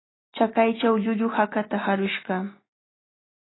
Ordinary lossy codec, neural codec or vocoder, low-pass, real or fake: AAC, 16 kbps; none; 7.2 kHz; real